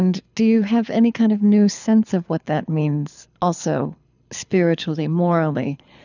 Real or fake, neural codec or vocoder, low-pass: fake; codec, 24 kHz, 6 kbps, HILCodec; 7.2 kHz